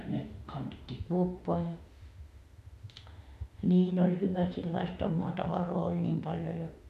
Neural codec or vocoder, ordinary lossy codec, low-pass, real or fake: autoencoder, 48 kHz, 32 numbers a frame, DAC-VAE, trained on Japanese speech; MP3, 64 kbps; 14.4 kHz; fake